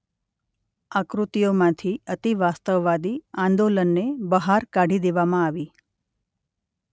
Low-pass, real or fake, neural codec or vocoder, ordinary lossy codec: none; real; none; none